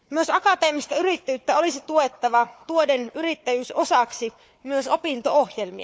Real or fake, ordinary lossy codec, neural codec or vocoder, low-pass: fake; none; codec, 16 kHz, 4 kbps, FunCodec, trained on Chinese and English, 50 frames a second; none